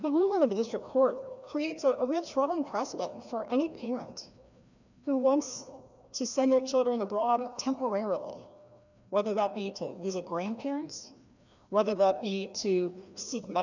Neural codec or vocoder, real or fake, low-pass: codec, 16 kHz, 1 kbps, FreqCodec, larger model; fake; 7.2 kHz